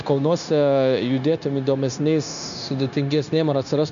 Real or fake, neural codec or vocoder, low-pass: fake; codec, 16 kHz, 0.9 kbps, LongCat-Audio-Codec; 7.2 kHz